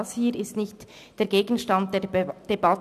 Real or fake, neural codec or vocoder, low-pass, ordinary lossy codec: real; none; 14.4 kHz; none